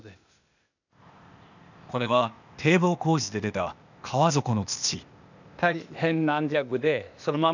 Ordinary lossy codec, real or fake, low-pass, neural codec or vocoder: none; fake; 7.2 kHz; codec, 16 kHz, 0.8 kbps, ZipCodec